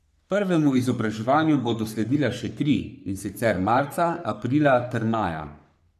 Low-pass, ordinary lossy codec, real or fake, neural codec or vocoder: 14.4 kHz; none; fake; codec, 44.1 kHz, 3.4 kbps, Pupu-Codec